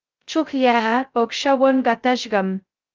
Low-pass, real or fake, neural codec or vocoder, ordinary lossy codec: 7.2 kHz; fake; codec, 16 kHz, 0.2 kbps, FocalCodec; Opus, 24 kbps